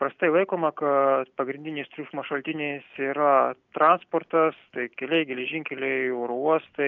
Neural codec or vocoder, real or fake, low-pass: none; real; 7.2 kHz